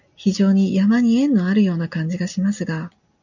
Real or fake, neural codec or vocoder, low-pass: real; none; 7.2 kHz